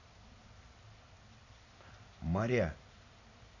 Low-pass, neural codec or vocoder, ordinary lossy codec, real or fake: 7.2 kHz; none; none; real